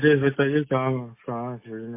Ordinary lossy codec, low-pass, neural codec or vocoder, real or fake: MP3, 24 kbps; 3.6 kHz; none; real